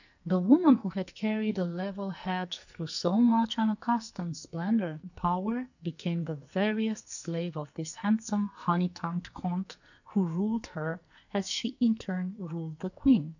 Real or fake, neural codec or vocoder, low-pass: fake; codec, 44.1 kHz, 2.6 kbps, SNAC; 7.2 kHz